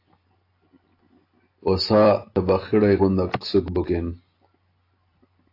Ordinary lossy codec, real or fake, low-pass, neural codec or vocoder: AAC, 32 kbps; real; 5.4 kHz; none